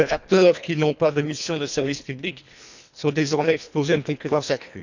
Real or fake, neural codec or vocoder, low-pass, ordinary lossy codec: fake; codec, 24 kHz, 1.5 kbps, HILCodec; 7.2 kHz; none